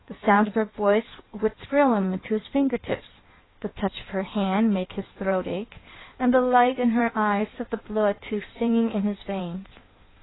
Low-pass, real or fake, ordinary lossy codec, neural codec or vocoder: 7.2 kHz; fake; AAC, 16 kbps; codec, 16 kHz in and 24 kHz out, 1.1 kbps, FireRedTTS-2 codec